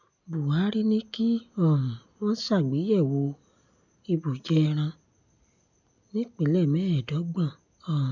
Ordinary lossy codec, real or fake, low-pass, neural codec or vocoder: none; real; 7.2 kHz; none